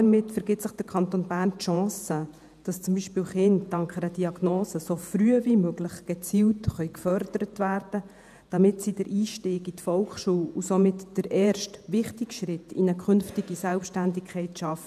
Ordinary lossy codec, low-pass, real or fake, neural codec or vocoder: none; 14.4 kHz; fake; vocoder, 48 kHz, 128 mel bands, Vocos